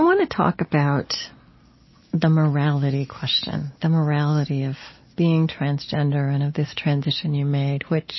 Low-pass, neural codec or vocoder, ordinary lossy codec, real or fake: 7.2 kHz; none; MP3, 24 kbps; real